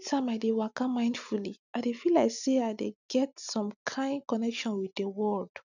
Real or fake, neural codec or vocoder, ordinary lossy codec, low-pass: real; none; none; 7.2 kHz